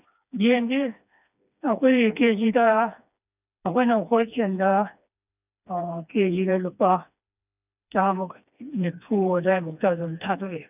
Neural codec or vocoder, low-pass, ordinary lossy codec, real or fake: codec, 16 kHz, 2 kbps, FreqCodec, smaller model; 3.6 kHz; none; fake